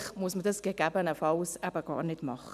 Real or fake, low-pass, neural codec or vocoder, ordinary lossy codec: real; 14.4 kHz; none; none